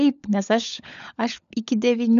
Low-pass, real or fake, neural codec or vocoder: 7.2 kHz; fake; codec, 16 kHz, 16 kbps, FunCodec, trained on LibriTTS, 50 frames a second